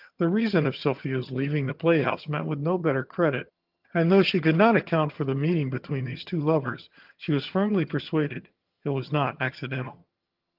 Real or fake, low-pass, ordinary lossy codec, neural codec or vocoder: fake; 5.4 kHz; Opus, 24 kbps; vocoder, 22.05 kHz, 80 mel bands, HiFi-GAN